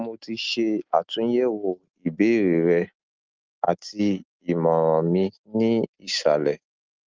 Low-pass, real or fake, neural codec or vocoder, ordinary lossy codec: 7.2 kHz; real; none; Opus, 32 kbps